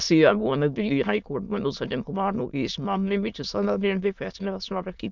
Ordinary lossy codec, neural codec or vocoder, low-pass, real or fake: none; autoencoder, 22.05 kHz, a latent of 192 numbers a frame, VITS, trained on many speakers; 7.2 kHz; fake